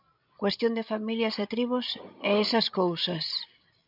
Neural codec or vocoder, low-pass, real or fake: codec, 16 kHz, 16 kbps, FreqCodec, larger model; 5.4 kHz; fake